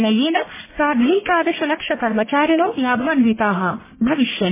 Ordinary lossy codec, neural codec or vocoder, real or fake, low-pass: MP3, 16 kbps; codec, 44.1 kHz, 1.7 kbps, Pupu-Codec; fake; 3.6 kHz